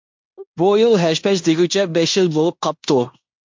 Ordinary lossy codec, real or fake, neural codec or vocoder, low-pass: MP3, 48 kbps; fake; codec, 16 kHz in and 24 kHz out, 0.9 kbps, LongCat-Audio-Codec, fine tuned four codebook decoder; 7.2 kHz